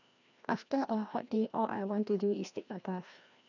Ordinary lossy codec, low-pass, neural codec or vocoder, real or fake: none; 7.2 kHz; codec, 16 kHz, 1 kbps, FreqCodec, larger model; fake